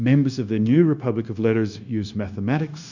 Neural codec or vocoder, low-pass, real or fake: codec, 16 kHz, 0.9 kbps, LongCat-Audio-Codec; 7.2 kHz; fake